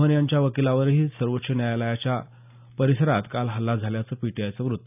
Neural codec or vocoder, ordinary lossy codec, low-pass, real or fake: none; none; 3.6 kHz; real